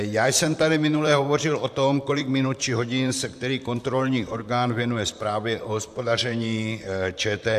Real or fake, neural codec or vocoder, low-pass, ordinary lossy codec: fake; vocoder, 44.1 kHz, 128 mel bands, Pupu-Vocoder; 14.4 kHz; AAC, 96 kbps